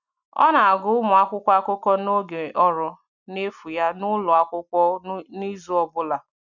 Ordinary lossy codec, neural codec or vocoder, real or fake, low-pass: none; none; real; 7.2 kHz